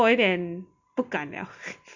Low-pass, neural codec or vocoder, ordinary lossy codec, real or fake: 7.2 kHz; none; none; real